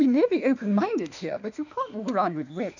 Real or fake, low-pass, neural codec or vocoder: fake; 7.2 kHz; autoencoder, 48 kHz, 32 numbers a frame, DAC-VAE, trained on Japanese speech